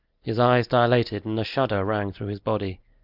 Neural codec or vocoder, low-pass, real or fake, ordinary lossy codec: none; 5.4 kHz; real; Opus, 24 kbps